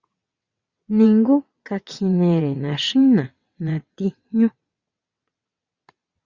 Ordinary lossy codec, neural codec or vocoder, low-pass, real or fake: Opus, 64 kbps; vocoder, 22.05 kHz, 80 mel bands, WaveNeXt; 7.2 kHz; fake